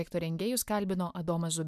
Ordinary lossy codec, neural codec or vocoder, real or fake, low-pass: MP3, 96 kbps; none; real; 14.4 kHz